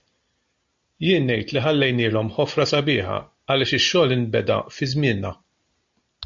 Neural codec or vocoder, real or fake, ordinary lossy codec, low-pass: none; real; MP3, 48 kbps; 7.2 kHz